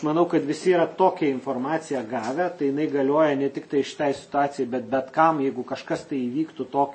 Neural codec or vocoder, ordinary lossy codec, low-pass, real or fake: none; MP3, 32 kbps; 9.9 kHz; real